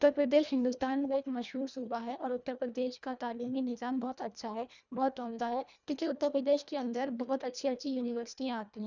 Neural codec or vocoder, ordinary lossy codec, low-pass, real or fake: codec, 24 kHz, 1.5 kbps, HILCodec; none; 7.2 kHz; fake